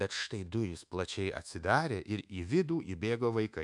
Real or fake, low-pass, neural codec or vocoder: fake; 10.8 kHz; codec, 24 kHz, 1.2 kbps, DualCodec